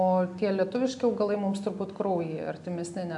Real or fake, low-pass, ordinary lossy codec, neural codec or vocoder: real; 10.8 kHz; AAC, 64 kbps; none